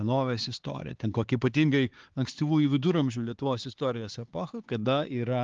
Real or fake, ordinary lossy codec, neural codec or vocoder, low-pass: fake; Opus, 16 kbps; codec, 16 kHz, 4 kbps, X-Codec, HuBERT features, trained on LibriSpeech; 7.2 kHz